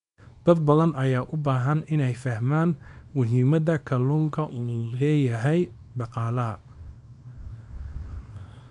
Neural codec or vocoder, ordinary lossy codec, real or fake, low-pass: codec, 24 kHz, 0.9 kbps, WavTokenizer, small release; none; fake; 10.8 kHz